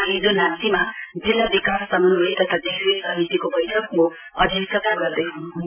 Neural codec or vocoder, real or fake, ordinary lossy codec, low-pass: vocoder, 44.1 kHz, 128 mel bands every 512 samples, BigVGAN v2; fake; none; 3.6 kHz